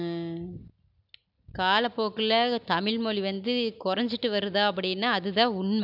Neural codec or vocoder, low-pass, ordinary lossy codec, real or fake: none; 5.4 kHz; none; real